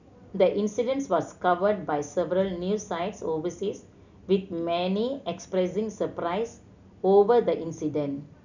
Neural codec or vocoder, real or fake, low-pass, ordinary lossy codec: none; real; 7.2 kHz; none